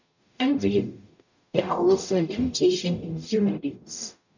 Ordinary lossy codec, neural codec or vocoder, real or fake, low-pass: none; codec, 44.1 kHz, 0.9 kbps, DAC; fake; 7.2 kHz